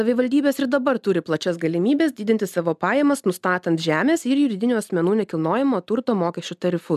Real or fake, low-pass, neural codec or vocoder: real; 14.4 kHz; none